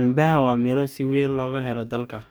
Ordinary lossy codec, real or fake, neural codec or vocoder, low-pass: none; fake; codec, 44.1 kHz, 2.6 kbps, DAC; none